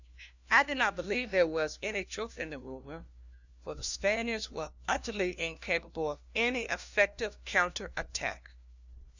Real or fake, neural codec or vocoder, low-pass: fake; codec, 16 kHz, 1 kbps, FunCodec, trained on LibriTTS, 50 frames a second; 7.2 kHz